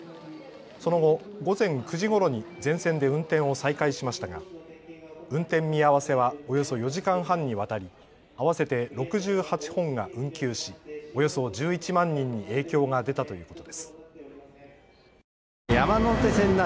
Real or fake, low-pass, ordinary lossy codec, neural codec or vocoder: real; none; none; none